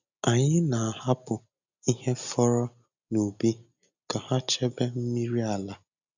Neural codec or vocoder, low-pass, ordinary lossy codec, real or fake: none; 7.2 kHz; none; real